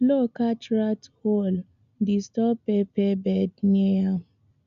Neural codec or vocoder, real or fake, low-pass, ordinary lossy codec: none; real; 7.2 kHz; none